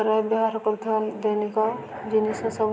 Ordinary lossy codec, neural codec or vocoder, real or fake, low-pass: none; none; real; none